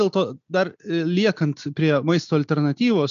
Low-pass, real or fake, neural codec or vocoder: 7.2 kHz; real; none